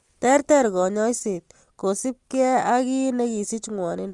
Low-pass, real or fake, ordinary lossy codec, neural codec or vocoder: 10.8 kHz; real; Opus, 32 kbps; none